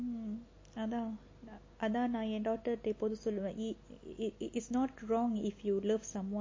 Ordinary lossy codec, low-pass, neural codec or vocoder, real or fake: MP3, 32 kbps; 7.2 kHz; none; real